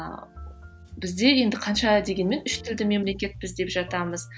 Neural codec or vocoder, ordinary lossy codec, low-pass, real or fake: none; none; none; real